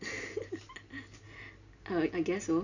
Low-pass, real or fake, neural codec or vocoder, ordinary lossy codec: 7.2 kHz; real; none; none